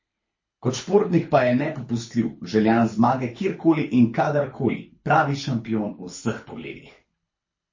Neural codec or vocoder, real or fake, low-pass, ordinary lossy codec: codec, 24 kHz, 6 kbps, HILCodec; fake; 7.2 kHz; MP3, 32 kbps